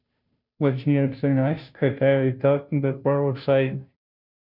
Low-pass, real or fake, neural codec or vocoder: 5.4 kHz; fake; codec, 16 kHz, 0.5 kbps, FunCodec, trained on Chinese and English, 25 frames a second